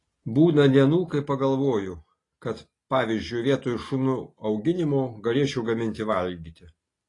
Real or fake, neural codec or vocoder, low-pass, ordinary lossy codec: real; none; 10.8 kHz; AAC, 32 kbps